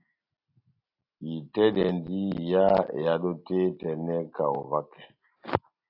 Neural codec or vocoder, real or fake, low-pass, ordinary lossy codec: none; real; 5.4 kHz; AAC, 48 kbps